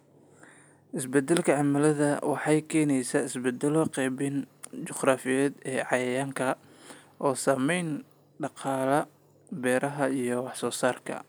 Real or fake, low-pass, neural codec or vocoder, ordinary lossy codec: real; none; none; none